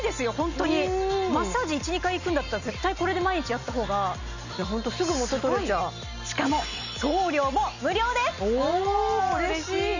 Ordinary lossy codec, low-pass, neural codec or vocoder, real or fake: none; 7.2 kHz; none; real